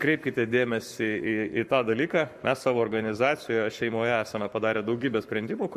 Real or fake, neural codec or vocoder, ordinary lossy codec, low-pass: fake; codec, 44.1 kHz, 7.8 kbps, Pupu-Codec; MP3, 64 kbps; 14.4 kHz